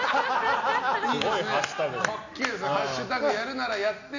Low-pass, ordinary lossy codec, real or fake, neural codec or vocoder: 7.2 kHz; none; real; none